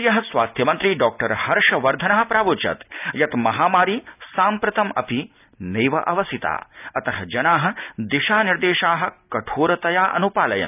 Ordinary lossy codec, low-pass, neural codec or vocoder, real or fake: none; 3.6 kHz; none; real